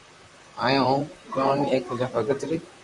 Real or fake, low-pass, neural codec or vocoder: fake; 10.8 kHz; vocoder, 44.1 kHz, 128 mel bands, Pupu-Vocoder